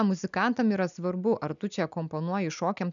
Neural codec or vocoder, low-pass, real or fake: none; 7.2 kHz; real